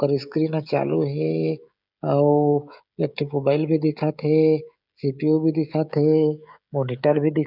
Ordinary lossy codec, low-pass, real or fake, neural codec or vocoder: none; 5.4 kHz; fake; codec, 16 kHz, 16 kbps, FreqCodec, smaller model